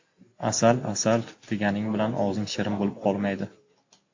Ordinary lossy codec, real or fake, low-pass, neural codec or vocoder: AAC, 48 kbps; real; 7.2 kHz; none